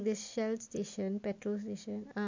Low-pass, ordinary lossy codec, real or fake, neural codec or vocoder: 7.2 kHz; MP3, 48 kbps; real; none